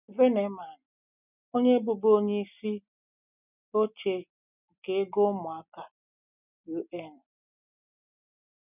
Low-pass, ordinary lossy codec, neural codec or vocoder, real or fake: 3.6 kHz; none; none; real